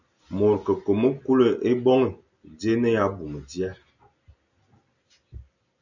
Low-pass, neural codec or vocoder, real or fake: 7.2 kHz; none; real